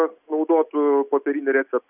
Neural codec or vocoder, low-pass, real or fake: none; 3.6 kHz; real